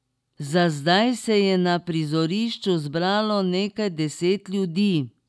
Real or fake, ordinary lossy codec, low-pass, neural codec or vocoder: real; none; none; none